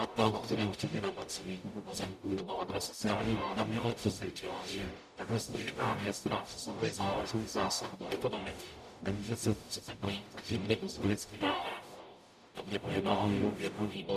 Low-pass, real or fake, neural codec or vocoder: 14.4 kHz; fake; codec, 44.1 kHz, 0.9 kbps, DAC